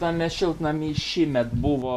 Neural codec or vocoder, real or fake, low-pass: none; real; 14.4 kHz